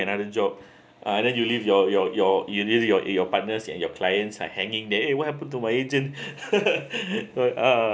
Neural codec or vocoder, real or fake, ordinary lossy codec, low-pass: none; real; none; none